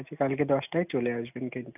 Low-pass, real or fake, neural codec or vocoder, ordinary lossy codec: 3.6 kHz; real; none; none